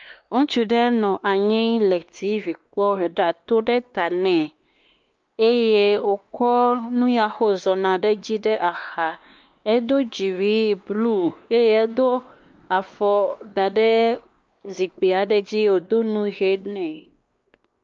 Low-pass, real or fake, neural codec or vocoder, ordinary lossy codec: 7.2 kHz; fake; codec, 16 kHz, 2 kbps, X-Codec, WavLM features, trained on Multilingual LibriSpeech; Opus, 24 kbps